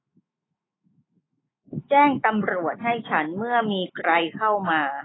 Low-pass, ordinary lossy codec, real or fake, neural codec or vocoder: 7.2 kHz; AAC, 16 kbps; fake; autoencoder, 48 kHz, 128 numbers a frame, DAC-VAE, trained on Japanese speech